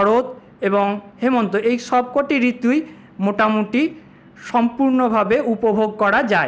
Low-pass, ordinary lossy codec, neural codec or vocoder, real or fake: none; none; none; real